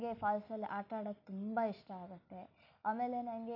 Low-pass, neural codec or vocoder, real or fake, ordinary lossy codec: 5.4 kHz; none; real; none